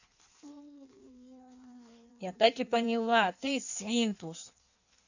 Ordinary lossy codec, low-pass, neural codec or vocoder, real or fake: none; 7.2 kHz; codec, 16 kHz in and 24 kHz out, 1.1 kbps, FireRedTTS-2 codec; fake